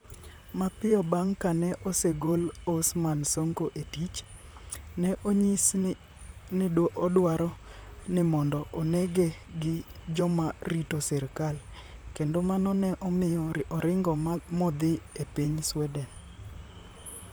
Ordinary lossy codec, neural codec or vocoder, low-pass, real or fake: none; vocoder, 44.1 kHz, 128 mel bands, Pupu-Vocoder; none; fake